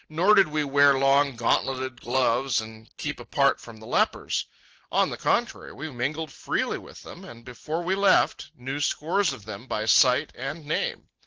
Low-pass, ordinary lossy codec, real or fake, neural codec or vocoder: 7.2 kHz; Opus, 16 kbps; real; none